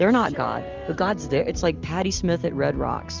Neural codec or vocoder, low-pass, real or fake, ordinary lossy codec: none; 7.2 kHz; real; Opus, 32 kbps